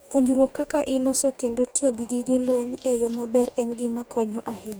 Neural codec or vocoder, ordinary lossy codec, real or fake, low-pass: codec, 44.1 kHz, 2.6 kbps, DAC; none; fake; none